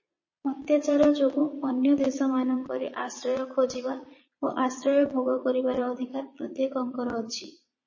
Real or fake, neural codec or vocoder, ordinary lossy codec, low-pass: fake; vocoder, 22.05 kHz, 80 mel bands, Vocos; MP3, 32 kbps; 7.2 kHz